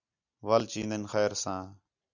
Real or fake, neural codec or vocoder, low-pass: real; none; 7.2 kHz